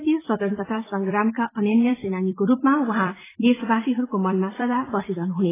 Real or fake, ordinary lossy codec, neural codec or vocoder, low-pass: fake; AAC, 16 kbps; vocoder, 44.1 kHz, 128 mel bands every 256 samples, BigVGAN v2; 3.6 kHz